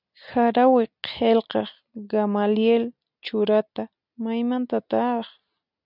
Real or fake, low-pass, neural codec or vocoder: real; 5.4 kHz; none